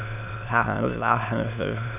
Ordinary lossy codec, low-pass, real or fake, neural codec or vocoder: none; 3.6 kHz; fake; autoencoder, 22.05 kHz, a latent of 192 numbers a frame, VITS, trained on many speakers